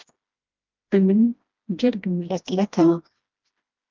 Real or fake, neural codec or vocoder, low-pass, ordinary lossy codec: fake; codec, 16 kHz, 1 kbps, FreqCodec, smaller model; 7.2 kHz; Opus, 24 kbps